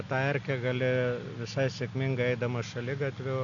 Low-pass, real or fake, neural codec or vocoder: 7.2 kHz; real; none